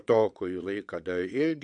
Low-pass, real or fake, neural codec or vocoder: 9.9 kHz; fake; vocoder, 22.05 kHz, 80 mel bands, WaveNeXt